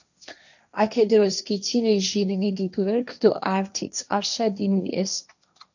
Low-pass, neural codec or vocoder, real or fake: 7.2 kHz; codec, 16 kHz, 1.1 kbps, Voila-Tokenizer; fake